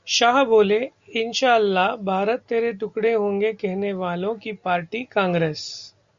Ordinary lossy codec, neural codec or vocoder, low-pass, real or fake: Opus, 64 kbps; none; 7.2 kHz; real